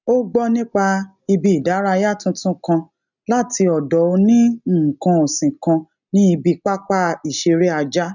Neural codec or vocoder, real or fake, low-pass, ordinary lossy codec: none; real; 7.2 kHz; none